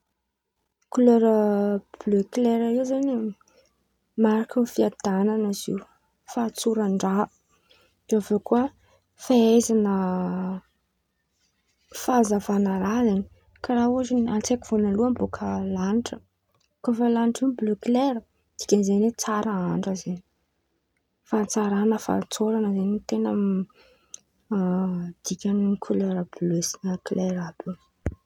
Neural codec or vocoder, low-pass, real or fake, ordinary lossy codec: none; 19.8 kHz; real; none